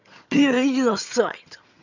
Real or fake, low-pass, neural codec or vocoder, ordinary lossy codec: fake; 7.2 kHz; vocoder, 22.05 kHz, 80 mel bands, HiFi-GAN; none